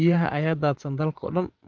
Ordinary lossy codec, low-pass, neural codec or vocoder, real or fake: Opus, 24 kbps; 7.2 kHz; vocoder, 24 kHz, 100 mel bands, Vocos; fake